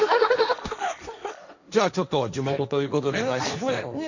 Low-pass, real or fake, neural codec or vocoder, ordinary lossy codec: 7.2 kHz; fake; codec, 16 kHz, 1.1 kbps, Voila-Tokenizer; none